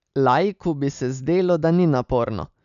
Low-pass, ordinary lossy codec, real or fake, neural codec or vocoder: 7.2 kHz; none; real; none